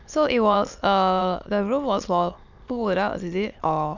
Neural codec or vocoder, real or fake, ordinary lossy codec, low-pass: autoencoder, 22.05 kHz, a latent of 192 numbers a frame, VITS, trained on many speakers; fake; none; 7.2 kHz